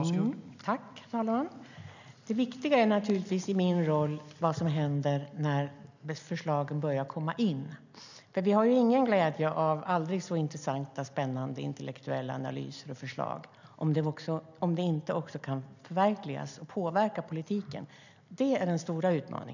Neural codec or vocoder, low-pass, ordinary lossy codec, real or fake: none; 7.2 kHz; none; real